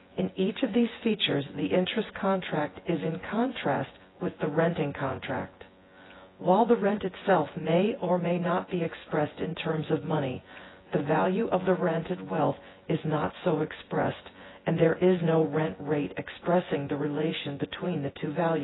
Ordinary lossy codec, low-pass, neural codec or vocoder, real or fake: AAC, 16 kbps; 7.2 kHz; vocoder, 24 kHz, 100 mel bands, Vocos; fake